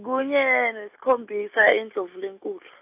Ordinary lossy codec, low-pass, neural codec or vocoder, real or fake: AAC, 32 kbps; 3.6 kHz; none; real